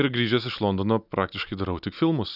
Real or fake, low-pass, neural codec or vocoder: real; 5.4 kHz; none